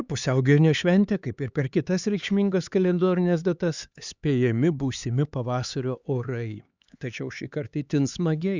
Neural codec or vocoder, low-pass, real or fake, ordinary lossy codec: codec, 16 kHz, 4 kbps, X-Codec, HuBERT features, trained on LibriSpeech; 7.2 kHz; fake; Opus, 64 kbps